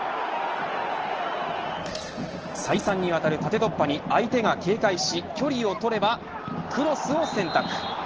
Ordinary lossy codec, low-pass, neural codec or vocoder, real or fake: Opus, 16 kbps; 7.2 kHz; none; real